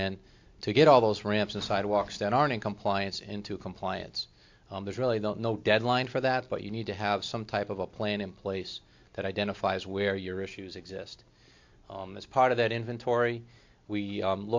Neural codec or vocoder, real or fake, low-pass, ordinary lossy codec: none; real; 7.2 kHz; MP3, 48 kbps